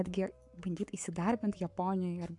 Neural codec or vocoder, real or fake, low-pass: codec, 44.1 kHz, 7.8 kbps, Pupu-Codec; fake; 10.8 kHz